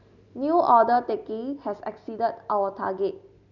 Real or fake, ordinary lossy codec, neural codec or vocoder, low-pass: real; none; none; 7.2 kHz